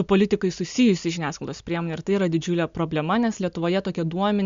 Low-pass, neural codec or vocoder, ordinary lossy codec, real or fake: 7.2 kHz; none; MP3, 64 kbps; real